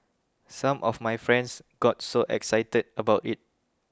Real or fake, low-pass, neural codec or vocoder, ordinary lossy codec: real; none; none; none